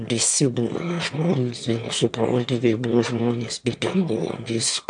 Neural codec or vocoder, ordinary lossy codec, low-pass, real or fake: autoencoder, 22.05 kHz, a latent of 192 numbers a frame, VITS, trained on one speaker; AAC, 64 kbps; 9.9 kHz; fake